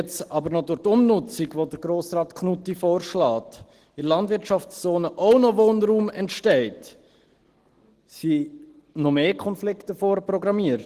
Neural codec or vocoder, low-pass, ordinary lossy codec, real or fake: none; 14.4 kHz; Opus, 16 kbps; real